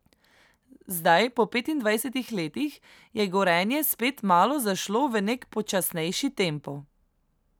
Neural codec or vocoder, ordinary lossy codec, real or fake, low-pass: none; none; real; none